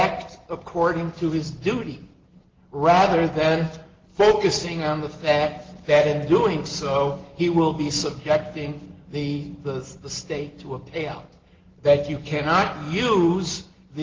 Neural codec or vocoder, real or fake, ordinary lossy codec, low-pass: none; real; Opus, 16 kbps; 7.2 kHz